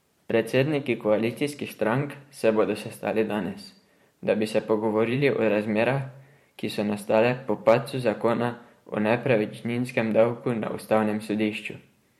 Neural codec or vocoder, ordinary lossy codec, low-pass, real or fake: vocoder, 44.1 kHz, 128 mel bands every 512 samples, BigVGAN v2; MP3, 64 kbps; 19.8 kHz; fake